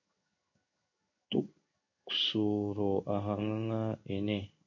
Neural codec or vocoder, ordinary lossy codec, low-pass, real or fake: codec, 16 kHz in and 24 kHz out, 1 kbps, XY-Tokenizer; MP3, 64 kbps; 7.2 kHz; fake